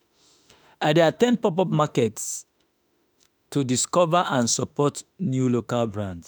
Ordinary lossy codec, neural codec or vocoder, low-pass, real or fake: none; autoencoder, 48 kHz, 32 numbers a frame, DAC-VAE, trained on Japanese speech; none; fake